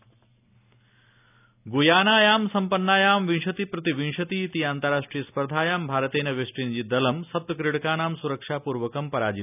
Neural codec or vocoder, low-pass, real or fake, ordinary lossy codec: none; 3.6 kHz; real; none